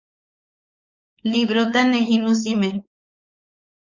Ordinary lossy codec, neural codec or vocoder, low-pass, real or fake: Opus, 64 kbps; codec, 16 kHz, 4.8 kbps, FACodec; 7.2 kHz; fake